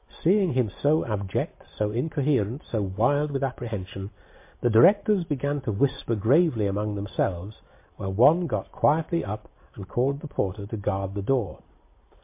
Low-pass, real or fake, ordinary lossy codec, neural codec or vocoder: 3.6 kHz; real; MP3, 24 kbps; none